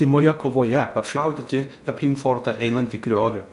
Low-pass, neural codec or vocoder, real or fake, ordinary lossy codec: 10.8 kHz; codec, 16 kHz in and 24 kHz out, 0.6 kbps, FocalCodec, streaming, 4096 codes; fake; AAC, 96 kbps